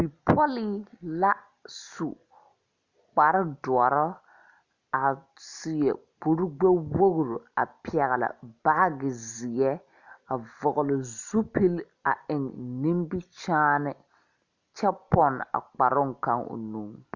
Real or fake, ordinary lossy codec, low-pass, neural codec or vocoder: real; Opus, 64 kbps; 7.2 kHz; none